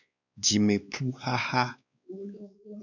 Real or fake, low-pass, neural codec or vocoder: fake; 7.2 kHz; codec, 16 kHz, 2 kbps, X-Codec, WavLM features, trained on Multilingual LibriSpeech